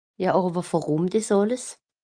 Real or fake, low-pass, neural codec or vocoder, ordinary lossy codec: real; 9.9 kHz; none; Opus, 32 kbps